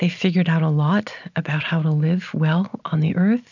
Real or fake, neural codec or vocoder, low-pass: real; none; 7.2 kHz